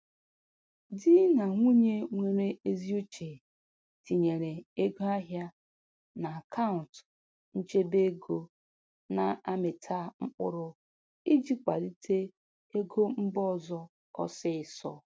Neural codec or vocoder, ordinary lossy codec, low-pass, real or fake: none; none; none; real